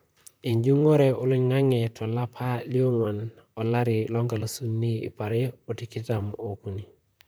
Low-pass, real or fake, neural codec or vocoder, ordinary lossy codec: none; fake; vocoder, 44.1 kHz, 128 mel bands, Pupu-Vocoder; none